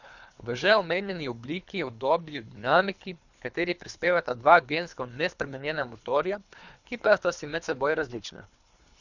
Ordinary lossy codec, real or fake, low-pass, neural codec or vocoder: none; fake; 7.2 kHz; codec, 24 kHz, 3 kbps, HILCodec